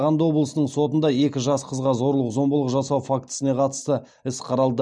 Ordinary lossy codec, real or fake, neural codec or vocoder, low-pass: none; real; none; 9.9 kHz